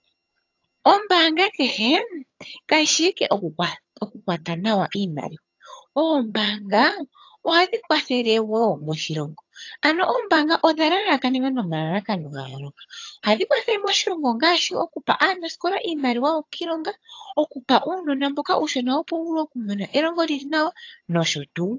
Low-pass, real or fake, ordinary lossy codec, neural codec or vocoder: 7.2 kHz; fake; AAC, 48 kbps; vocoder, 22.05 kHz, 80 mel bands, HiFi-GAN